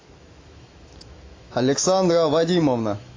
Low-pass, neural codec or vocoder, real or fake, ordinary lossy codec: 7.2 kHz; autoencoder, 48 kHz, 128 numbers a frame, DAC-VAE, trained on Japanese speech; fake; AAC, 32 kbps